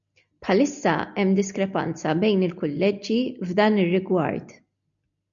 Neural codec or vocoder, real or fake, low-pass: none; real; 7.2 kHz